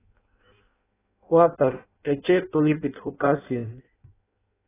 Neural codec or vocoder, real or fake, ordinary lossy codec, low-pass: codec, 16 kHz in and 24 kHz out, 0.6 kbps, FireRedTTS-2 codec; fake; AAC, 16 kbps; 3.6 kHz